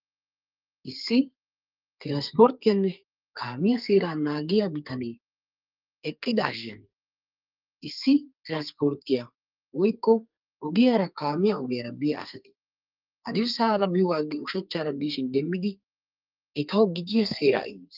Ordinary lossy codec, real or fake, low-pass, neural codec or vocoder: Opus, 24 kbps; fake; 5.4 kHz; codec, 32 kHz, 1.9 kbps, SNAC